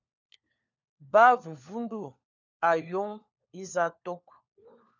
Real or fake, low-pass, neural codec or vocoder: fake; 7.2 kHz; codec, 16 kHz, 4 kbps, FunCodec, trained on LibriTTS, 50 frames a second